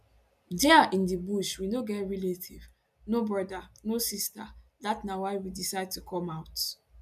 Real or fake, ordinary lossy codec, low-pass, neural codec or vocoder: real; none; 14.4 kHz; none